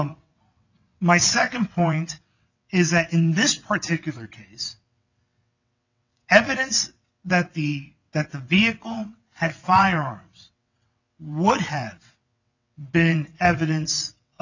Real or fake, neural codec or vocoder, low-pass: fake; vocoder, 22.05 kHz, 80 mel bands, WaveNeXt; 7.2 kHz